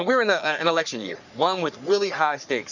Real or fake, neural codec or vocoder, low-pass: fake; codec, 44.1 kHz, 3.4 kbps, Pupu-Codec; 7.2 kHz